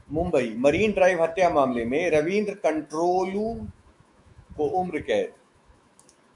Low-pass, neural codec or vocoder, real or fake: 10.8 kHz; autoencoder, 48 kHz, 128 numbers a frame, DAC-VAE, trained on Japanese speech; fake